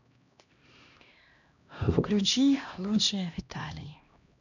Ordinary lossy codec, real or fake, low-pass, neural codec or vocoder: none; fake; 7.2 kHz; codec, 16 kHz, 1 kbps, X-Codec, HuBERT features, trained on LibriSpeech